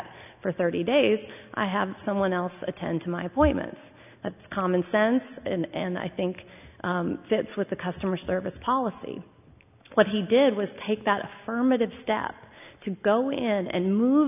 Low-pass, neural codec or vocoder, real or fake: 3.6 kHz; none; real